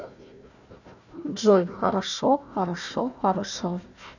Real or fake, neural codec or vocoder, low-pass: fake; codec, 16 kHz, 1 kbps, FunCodec, trained on Chinese and English, 50 frames a second; 7.2 kHz